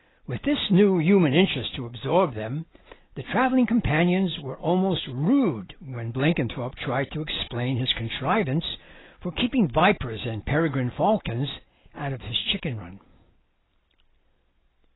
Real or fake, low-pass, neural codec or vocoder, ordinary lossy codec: real; 7.2 kHz; none; AAC, 16 kbps